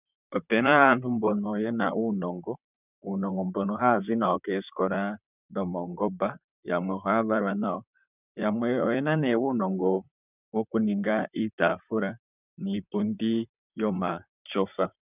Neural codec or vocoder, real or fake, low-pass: vocoder, 44.1 kHz, 128 mel bands, Pupu-Vocoder; fake; 3.6 kHz